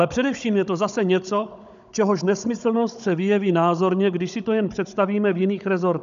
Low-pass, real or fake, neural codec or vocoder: 7.2 kHz; fake; codec, 16 kHz, 16 kbps, FunCodec, trained on Chinese and English, 50 frames a second